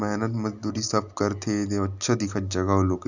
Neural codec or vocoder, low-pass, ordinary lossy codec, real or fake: none; 7.2 kHz; none; real